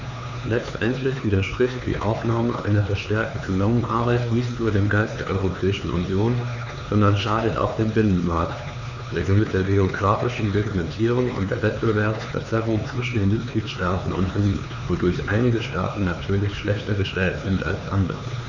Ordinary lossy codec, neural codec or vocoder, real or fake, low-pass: none; codec, 16 kHz, 4 kbps, X-Codec, HuBERT features, trained on LibriSpeech; fake; 7.2 kHz